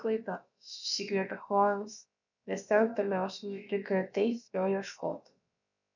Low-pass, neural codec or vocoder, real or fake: 7.2 kHz; codec, 16 kHz, about 1 kbps, DyCAST, with the encoder's durations; fake